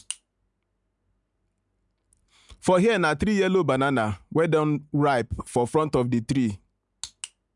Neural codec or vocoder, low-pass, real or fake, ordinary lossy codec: none; 10.8 kHz; real; none